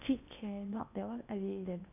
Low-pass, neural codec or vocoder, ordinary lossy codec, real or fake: 3.6 kHz; codec, 16 kHz in and 24 kHz out, 0.8 kbps, FocalCodec, streaming, 65536 codes; none; fake